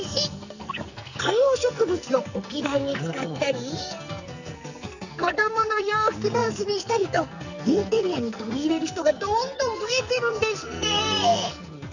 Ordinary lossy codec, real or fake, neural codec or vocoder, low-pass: none; fake; codec, 44.1 kHz, 2.6 kbps, SNAC; 7.2 kHz